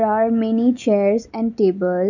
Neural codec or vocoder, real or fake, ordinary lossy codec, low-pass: none; real; none; 7.2 kHz